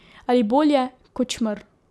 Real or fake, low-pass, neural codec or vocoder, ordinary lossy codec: real; none; none; none